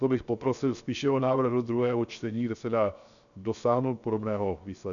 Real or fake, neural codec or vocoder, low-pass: fake; codec, 16 kHz, 0.7 kbps, FocalCodec; 7.2 kHz